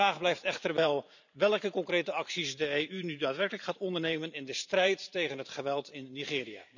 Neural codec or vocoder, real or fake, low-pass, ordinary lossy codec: vocoder, 44.1 kHz, 128 mel bands every 512 samples, BigVGAN v2; fake; 7.2 kHz; none